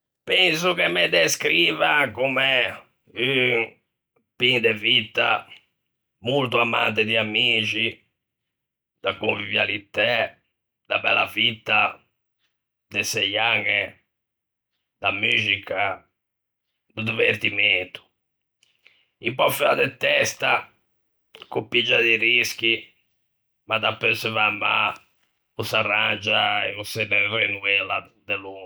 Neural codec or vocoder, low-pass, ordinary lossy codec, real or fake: none; none; none; real